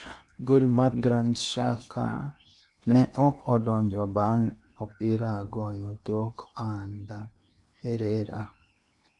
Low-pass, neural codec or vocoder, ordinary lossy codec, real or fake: 10.8 kHz; codec, 16 kHz in and 24 kHz out, 0.8 kbps, FocalCodec, streaming, 65536 codes; none; fake